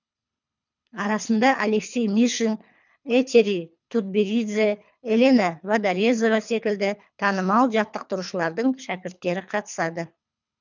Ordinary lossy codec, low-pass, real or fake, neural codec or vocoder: none; 7.2 kHz; fake; codec, 24 kHz, 3 kbps, HILCodec